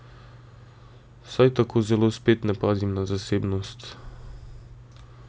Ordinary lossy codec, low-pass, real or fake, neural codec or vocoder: none; none; real; none